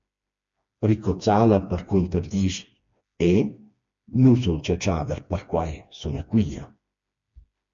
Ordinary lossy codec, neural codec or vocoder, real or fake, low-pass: MP3, 48 kbps; codec, 16 kHz, 2 kbps, FreqCodec, smaller model; fake; 7.2 kHz